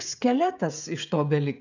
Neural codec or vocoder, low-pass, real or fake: codec, 16 kHz, 16 kbps, FreqCodec, smaller model; 7.2 kHz; fake